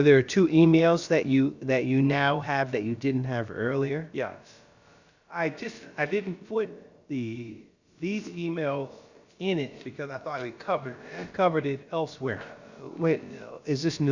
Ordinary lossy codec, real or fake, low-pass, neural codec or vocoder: Opus, 64 kbps; fake; 7.2 kHz; codec, 16 kHz, about 1 kbps, DyCAST, with the encoder's durations